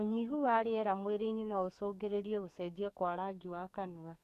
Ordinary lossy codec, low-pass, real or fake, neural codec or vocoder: none; 14.4 kHz; fake; codec, 44.1 kHz, 2.6 kbps, SNAC